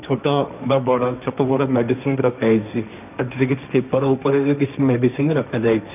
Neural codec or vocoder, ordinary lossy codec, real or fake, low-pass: codec, 16 kHz, 1.1 kbps, Voila-Tokenizer; none; fake; 3.6 kHz